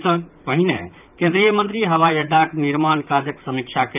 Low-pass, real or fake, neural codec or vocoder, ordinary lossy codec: 3.6 kHz; fake; vocoder, 44.1 kHz, 128 mel bands, Pupu-Vocoder; none